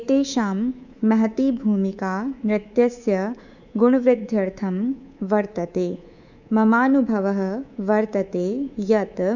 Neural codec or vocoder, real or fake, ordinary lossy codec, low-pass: codec, 24 kHz, 3.1 kbps, DualCodec; fake; none; 7.2 kHz